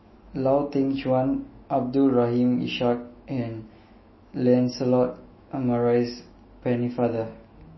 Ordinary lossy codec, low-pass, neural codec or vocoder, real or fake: MP3, 24 kbps; 7.2 kHz; autoencoder, 48 kHz, 128 numbers a frame, DAC-VAE, trained on Japanese speech; fake